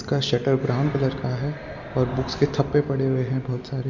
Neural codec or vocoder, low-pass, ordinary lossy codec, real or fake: none; 7.2 kHz; none; real